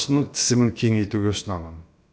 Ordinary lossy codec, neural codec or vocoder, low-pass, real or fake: none; codec, 16 kHz, about 1 kbps, DyCAST, with the encoder's durations; none; fake